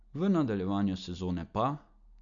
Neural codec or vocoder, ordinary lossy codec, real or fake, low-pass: none; none; real; 7.2 kHz